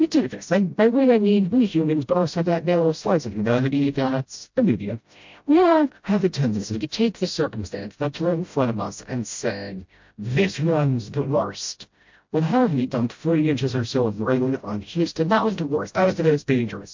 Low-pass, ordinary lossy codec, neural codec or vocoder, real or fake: 7.2 kHz; MP3, 48 kbps; codec, 16 kHz, 0.5 kbps, FreqCodec, smaller model; fake